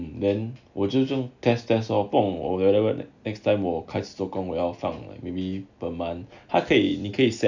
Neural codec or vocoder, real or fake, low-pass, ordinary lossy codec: none; real; 7.2 kHz; none